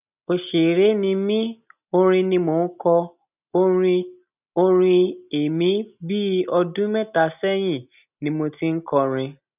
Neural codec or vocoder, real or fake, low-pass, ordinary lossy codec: none; real; 3.6 kHz; none